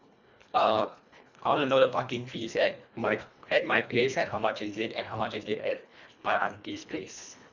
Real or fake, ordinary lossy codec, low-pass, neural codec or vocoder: fake; none; 7.2 kHz; codec, 24 kHz, 1.5 kbps, HILCodec